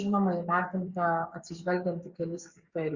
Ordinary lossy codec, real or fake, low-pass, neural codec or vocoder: Opus, 64 kbps; fake; 7.2 kHz; codec, 44.1 kHz, 7.8 kbps, DAC